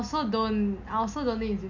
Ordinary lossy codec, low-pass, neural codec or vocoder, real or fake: none; 7.2 kHz; none; real